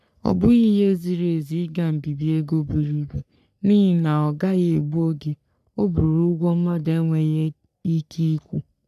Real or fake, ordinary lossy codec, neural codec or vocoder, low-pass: fake; none; codec, 44.1 kHz, 3.4 kbps, Pupu-Codec; 14.4 kHz